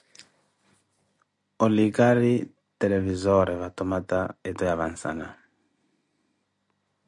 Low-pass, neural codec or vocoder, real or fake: 10.8 kHz; none; real